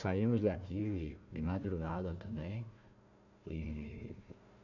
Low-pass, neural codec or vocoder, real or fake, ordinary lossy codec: 7.2 kHz; codec, 16 kHz, 1 kbps, FunCodec, trained on Chinese and English, 50 frames a second; fake; none